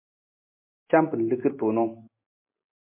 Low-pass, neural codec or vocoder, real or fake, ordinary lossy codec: 3.6 kHz; none; real; MP3, 24 kbps